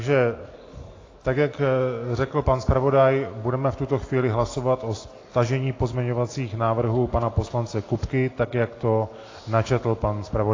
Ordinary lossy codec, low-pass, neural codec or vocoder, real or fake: AAC, 32 kbps; 7.2 kHz; none; real